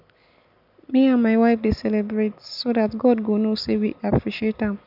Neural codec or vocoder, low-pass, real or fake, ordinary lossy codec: none; 5.4 kHz; real; none